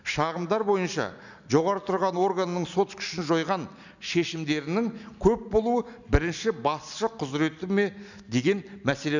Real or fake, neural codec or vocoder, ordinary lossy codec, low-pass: real; none; none; 7.2 kHz